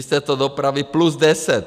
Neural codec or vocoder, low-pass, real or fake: none; 14.4 kHz; real